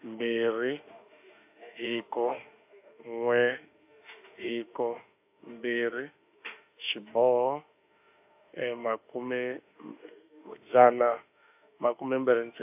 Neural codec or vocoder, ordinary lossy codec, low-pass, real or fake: autoencoder, 48 kHz, 32 numbers a frame, DAC-VAE, trained on Japanese speech; AAC, 32 kbps; 3.6 kHz; fake